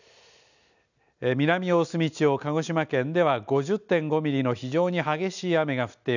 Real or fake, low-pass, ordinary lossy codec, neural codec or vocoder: real; 7.2 kHz; none; none